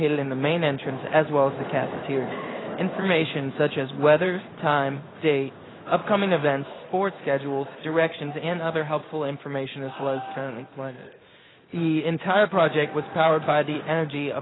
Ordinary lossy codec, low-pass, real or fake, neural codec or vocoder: AAC, 16 kbps; 7.2 kHz; fake; codec, 16 kHz in and 24 kHz out, 1 kbps, XY-Tokenizer